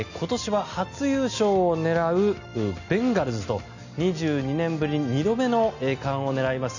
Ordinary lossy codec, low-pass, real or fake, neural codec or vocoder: none; 7.2 kHz; real; none